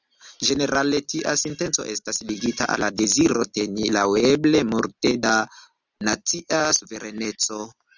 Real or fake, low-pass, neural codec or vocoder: real; 7.2 kHz; none